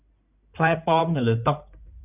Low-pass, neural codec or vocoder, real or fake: 3.6 kHz; codec, 16 kHz in and 24 kHz out, 2.2 kbps, FireRedTTS-2 codec; fake